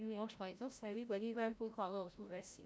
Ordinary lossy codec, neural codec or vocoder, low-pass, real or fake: none; codec, 16 kHz, 0.5 kbps, FreqCodec, larger model; none; fake